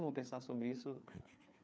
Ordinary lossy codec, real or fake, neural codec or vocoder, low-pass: none; fake; codec, 16 kHz, 4 kbps, FreqCodec, larger model; none